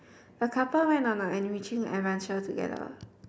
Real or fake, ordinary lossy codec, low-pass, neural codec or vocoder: real; none; none; none